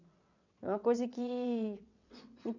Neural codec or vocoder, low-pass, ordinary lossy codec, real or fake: vocoder, 22.05 kHz, 80 mel bands, WaveNeXt; 7.2 kHz; none; fake